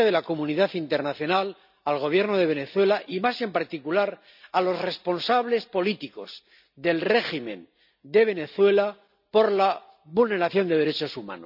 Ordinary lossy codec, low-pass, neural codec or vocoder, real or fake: none; 5.4 kHz; none; real